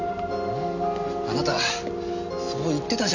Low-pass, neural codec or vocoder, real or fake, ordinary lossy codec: 7.2 kHz; none; real; none